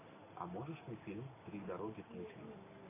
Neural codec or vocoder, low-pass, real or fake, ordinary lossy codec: none; 3.6 kHz; real; MP3, 16 kbps